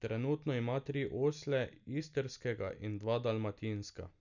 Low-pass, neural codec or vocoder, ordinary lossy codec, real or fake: 7.2 kHz; none; none; real